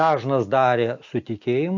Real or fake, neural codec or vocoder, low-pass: real; none; 7.2 kHz